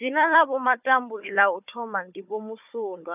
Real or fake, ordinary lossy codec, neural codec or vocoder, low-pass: fake; none; codec, 16 kHz, 4 kbps, FunCodec, trained on Chinese and English, 50 frames a second; 3.6 kHz